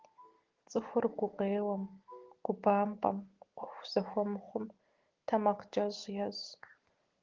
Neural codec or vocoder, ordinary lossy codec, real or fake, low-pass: none; Opus, 16 kbps; real; 7.2 kHz